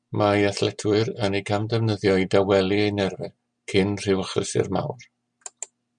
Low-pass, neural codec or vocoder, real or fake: 9.9 kHz; none; real